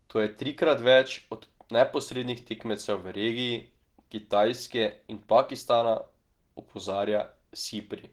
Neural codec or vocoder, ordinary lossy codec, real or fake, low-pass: none; Opus, 16 kbps; real; 19.8 kHz